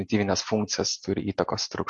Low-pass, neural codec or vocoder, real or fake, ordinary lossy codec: 10.8 kHz; none; real; MP3, 48 kbps